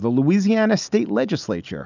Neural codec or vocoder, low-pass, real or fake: none; 7.2 kHz; real